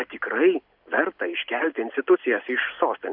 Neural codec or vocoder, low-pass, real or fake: none; 5.4 kHz; real